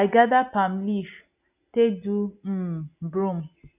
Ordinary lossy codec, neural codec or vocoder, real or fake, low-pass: none; none; real; 3.6 kHz